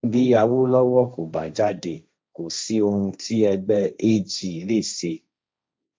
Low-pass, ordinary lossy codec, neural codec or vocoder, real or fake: none; none; codec, 16 kHz, 1.1 kbps, Voila-Tokenizer; fake